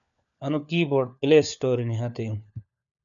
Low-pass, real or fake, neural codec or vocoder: 7.2 kHz; fake; codec, 16 kHz, 4 kbps, FunCodec, trained on LibriTTS, 50 frames a second